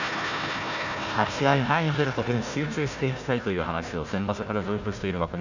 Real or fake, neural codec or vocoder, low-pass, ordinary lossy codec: fake; codec, 16 kHz, 1 kbps, FunCodec, trained on Chinese and English, 50 frames a second; 7.2 kHz; none